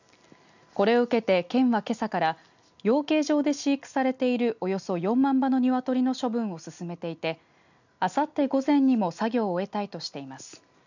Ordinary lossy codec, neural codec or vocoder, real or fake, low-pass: none; none; real; 7.2 kHz